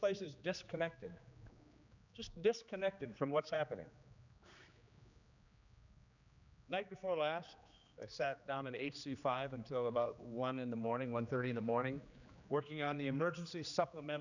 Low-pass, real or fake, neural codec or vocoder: 7.2 kHz; fake; codec, 16 kHz, 2 kbps, X-Codec, HuBERT features, trained on general audio